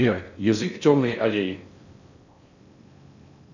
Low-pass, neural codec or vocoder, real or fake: 7.2 kHz; codec, 16 kHz in and 24 kHz out, 0.6 kbps, FocalCodec, streaming, 4096 codes; fake